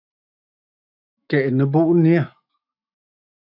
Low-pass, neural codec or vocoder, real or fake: 5.4 kHz; autoencoder, 48 kHz, 128 numbers a frame, DAC-VAE, trained on Japanese speech; fake